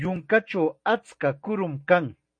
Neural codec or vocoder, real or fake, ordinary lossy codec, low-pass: none; real; MP3, 48 kbps; 9.9 kHz